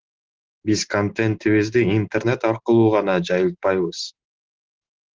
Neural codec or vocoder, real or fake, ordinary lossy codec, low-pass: none; real; Opus, 16 kbps; 7.2 kHz